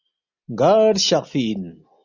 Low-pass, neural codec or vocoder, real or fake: 7.2 kHz; none; real